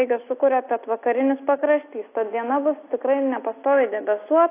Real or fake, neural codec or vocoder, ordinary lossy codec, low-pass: real; none; AAC, 24 kbps; 3.6 kHz